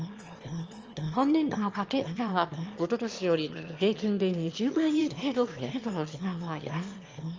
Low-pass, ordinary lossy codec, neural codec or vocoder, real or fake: 7.2 kHz; Opus, 24 kbps; autoencoder, 22.05 kHz, a latent of 192 numbers a frame, VITS, trained on one speaker; fake